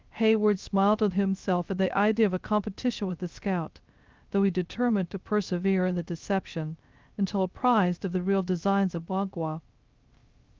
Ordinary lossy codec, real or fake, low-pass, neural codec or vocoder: Opus, 24 kbps; fake; 7.2 kHz; codec, 16 kHz, 0.3 kbps, FocalCodec